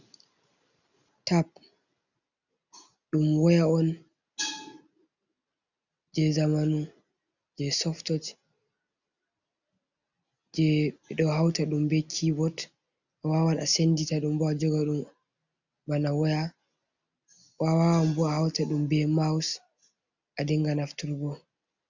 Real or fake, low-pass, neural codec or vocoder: real; 7.2 kHz; none